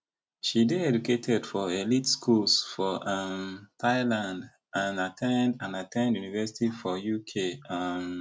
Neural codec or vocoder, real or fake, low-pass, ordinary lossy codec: none; real; none; none